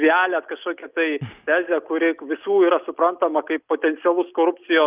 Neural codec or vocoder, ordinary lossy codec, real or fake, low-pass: none; Opus, 24 kbps; real; 3.6 kHz